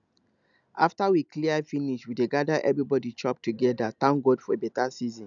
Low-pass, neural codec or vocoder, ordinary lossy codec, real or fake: 7.2 kHz; none; none; real